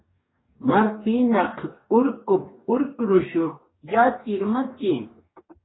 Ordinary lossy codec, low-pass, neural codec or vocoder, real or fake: AAC, 16 kbps; 7.2 kHz; codec, 44.1 kHz, 2.6 kbps, DAC; fake